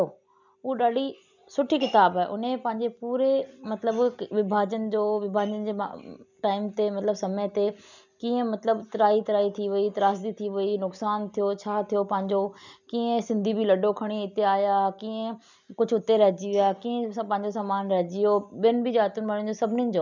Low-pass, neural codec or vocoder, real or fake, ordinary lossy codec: 7.2 kHz; none; real; none